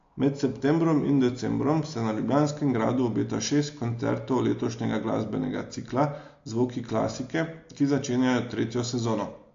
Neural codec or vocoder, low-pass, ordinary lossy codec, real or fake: none; 7.2 kHz; AAC, 48 kbps; real